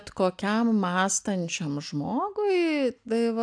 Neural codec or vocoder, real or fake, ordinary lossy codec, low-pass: none; real; AAC, 64 kbps; 9.9 kHz